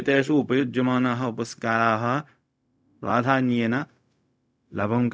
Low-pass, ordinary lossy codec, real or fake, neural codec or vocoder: none; none; fake; codec, 16 kHz, 0.4 kbps, LongCat-Audio-Codec